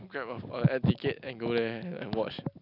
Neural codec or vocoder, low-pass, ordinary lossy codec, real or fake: none; 5.4 kHz; none; real